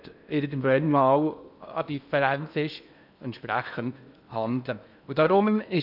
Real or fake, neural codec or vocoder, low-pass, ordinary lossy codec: fake; codec, 16 kHz in and 24 kHz out, 0.8 kbps, FocalCodec, streaming, 65536 codes; 5.4 kHz; none